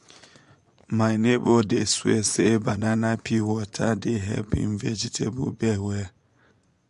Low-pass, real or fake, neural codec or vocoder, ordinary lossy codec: 10.8 kHz; fake; vocoder, 24 kHz, 100 mel bands, Vocos; MP3, 64 kbps